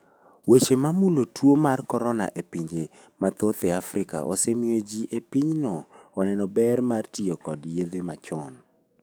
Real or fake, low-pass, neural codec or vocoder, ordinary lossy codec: fake; none; codec, 44.1 kHz, 7.8 kbps, DAC; none